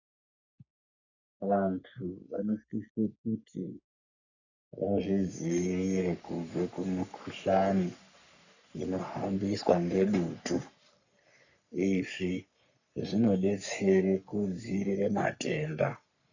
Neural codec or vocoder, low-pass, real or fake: codec, 44.1 kHz, 3.4 kbps, Pupu-Codec; 7.2 kHz; fake